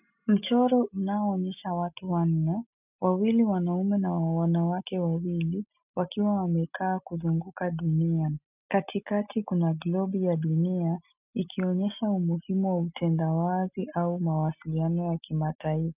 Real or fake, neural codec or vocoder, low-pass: real; none; 3.6 kHz